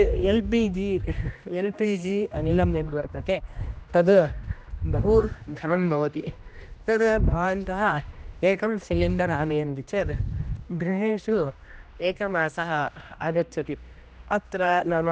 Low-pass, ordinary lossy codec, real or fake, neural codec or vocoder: none; none; fake; codec, 16 kHz, 1 kbps, X-Codec, HuBERT features, trained on general audio